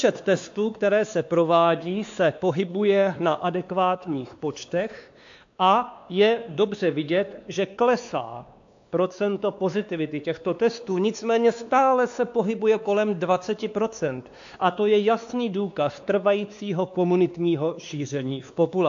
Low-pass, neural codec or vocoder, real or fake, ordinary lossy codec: 7.2 kHz; codec, 16 kHz, 2 kbps, X-Codec, WavLM features, trained on Multilingual LibriSpeech; fake; AAC, 64 kbps